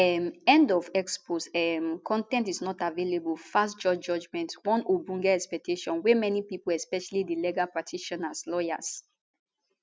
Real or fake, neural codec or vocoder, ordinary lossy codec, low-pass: real; none; none; none